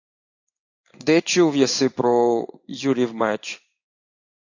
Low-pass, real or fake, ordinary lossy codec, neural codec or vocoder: 7.2 kHz; fake; AAC, 48 kbps; codec, 16 kHz in and 24 kHz out, 1 kbps, XY-Tokenizer